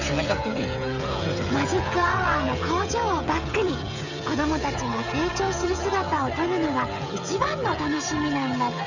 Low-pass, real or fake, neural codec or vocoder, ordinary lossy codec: 7.2 kHz; fake; codec, 16 kHz, 16 kbps, FreqCodec, smaller model; none